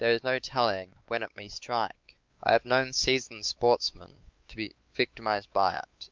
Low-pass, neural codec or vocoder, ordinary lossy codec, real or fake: 7.2 kHz; codec, 16 kHz, 4 kbps, X-Codec, WavLM features, trained on Multilingual LibriSpeech; Opus, 24 kbps; fake